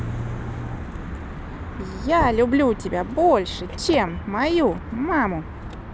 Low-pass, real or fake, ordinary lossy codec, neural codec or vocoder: none; real; none; none